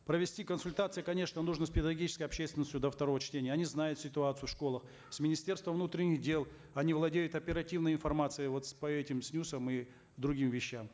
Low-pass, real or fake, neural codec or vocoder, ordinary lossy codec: none; real; none; none